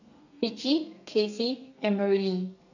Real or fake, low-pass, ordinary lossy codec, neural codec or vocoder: fake; 7.2 kHz; none; codec, 32 kHz, 1.9 kbps, SNAC